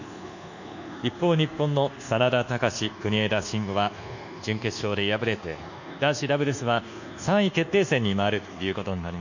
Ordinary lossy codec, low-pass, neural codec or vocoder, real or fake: none; 7.2 kHz; codec, 24 kHz, 1.2 kbps, DualCodec; fake